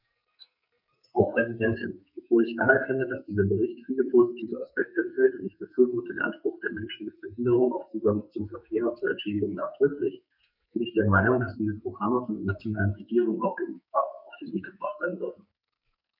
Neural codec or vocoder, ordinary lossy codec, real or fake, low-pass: codec, 44.1 kHz, 2.6 kbps, SNAC; none; fake; 5.4 kHz